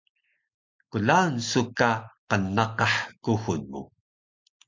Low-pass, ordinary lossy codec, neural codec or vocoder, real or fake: 7.2 kHz; MP3, 64 kbps; none; real